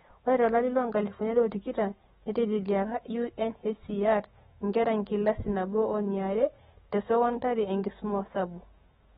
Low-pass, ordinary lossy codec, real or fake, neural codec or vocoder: 19.8 kHz; AAC, 16 kbps; fake; autoencoder, 48 kHz, 128 numbers a frame, DAC-VAE, trained on Japanese speech